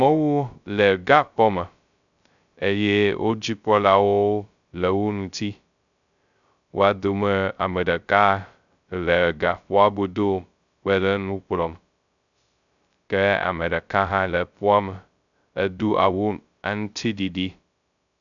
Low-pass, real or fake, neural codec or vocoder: 7.2 kHz; fake; codec, 16 kHz, 0.2 kbps, FocalCodec